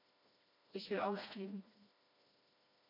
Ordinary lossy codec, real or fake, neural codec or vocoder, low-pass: MP3, 48 kbps; fake; codec, 16 kHz, 1 kbps, FreqCodec, smaller model; 5.4 kHz